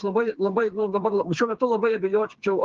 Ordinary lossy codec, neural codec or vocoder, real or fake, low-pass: Opus, 16 kbps; codec, 16 kHz, 4 kbps, FreqCodec, smaller model; fake; 7.2 kHz